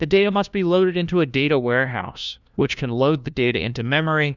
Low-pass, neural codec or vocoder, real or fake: 7.2 kHz; codec, 16 kHz, 1 kbps, FunCodec, trained on LibriTTS, 50 frames a second; fake